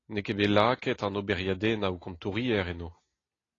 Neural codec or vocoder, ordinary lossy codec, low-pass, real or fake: none; AAC, 32 kbps; 7.2 kHz; real